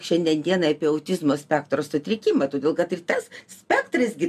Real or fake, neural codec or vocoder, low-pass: real; none; 14.4 kHz